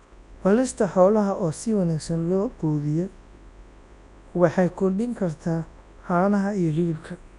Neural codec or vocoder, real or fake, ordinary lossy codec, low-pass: codec, 24 kHz, 0.9 kbps, WavTokenizer, large speech release; fake; none; 10.8 kHz